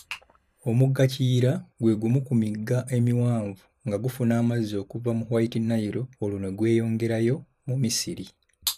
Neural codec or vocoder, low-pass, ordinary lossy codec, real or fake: vocoder, 44.1 kHz, 128 mel bands every 512 samples, BigVGAN v2; 14.4 kHz; none; fake